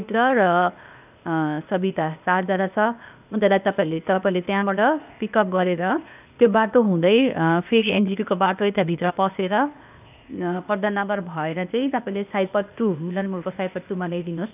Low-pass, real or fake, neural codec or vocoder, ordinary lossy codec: 3.6 kHz; fake; codec, 16 kHz, 0.8 kbps, ZipCodec; none